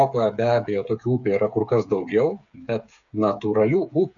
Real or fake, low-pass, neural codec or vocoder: fake; 7.2 kHz; codec, 16 kHz, 8 kbps, FreqCodec, smaller model